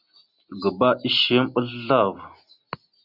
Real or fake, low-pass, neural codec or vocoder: real; 5.4 kHz; none